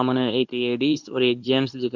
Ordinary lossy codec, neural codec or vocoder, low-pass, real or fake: none; codec, 24 kHz, 0.9 kbps, WavTokenizer, medium speech release version 2; 7.2 kHz; fake